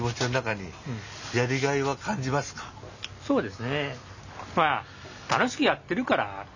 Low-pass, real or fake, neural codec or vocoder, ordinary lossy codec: 7.2 kHz; real; none; none